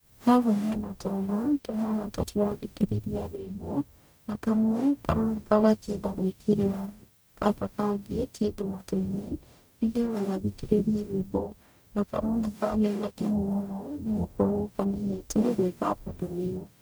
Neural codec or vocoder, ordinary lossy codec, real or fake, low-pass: codec, 44.1 kHz, 0.9 kbps, DAC; none; fake; none